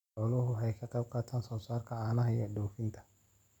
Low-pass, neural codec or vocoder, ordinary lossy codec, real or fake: 19.8 kHz; none; none; real